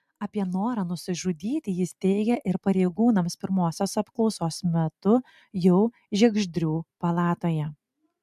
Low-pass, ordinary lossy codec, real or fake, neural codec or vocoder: 14.4 kHz; MP3, 96 kbps; real; none